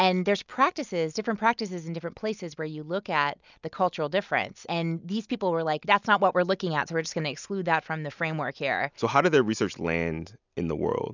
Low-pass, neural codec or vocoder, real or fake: 7.2 kHz; none; real